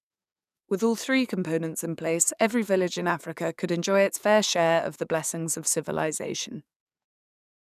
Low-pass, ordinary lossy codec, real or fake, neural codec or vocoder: 14.4 kHz; none; fake; codec, 44.1 kHz, 7.8 kbps, DAC